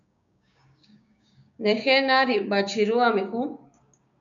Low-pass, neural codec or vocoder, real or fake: 7.2 kHz; codec, 16 kHz, 6 kbps, DAC; fake